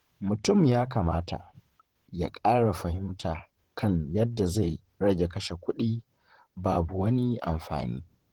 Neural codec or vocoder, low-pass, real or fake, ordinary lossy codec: vocoder, 44.1 kHz, 128 mel bands, Pupu-Vocoder; 19.8 kHz; fake; Opus, 16 kbps